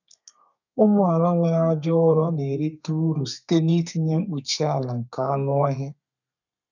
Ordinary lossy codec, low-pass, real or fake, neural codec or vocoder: none; 7.2 kHz; fake; codec, 32 kHz, 1.9 kbps, SNAC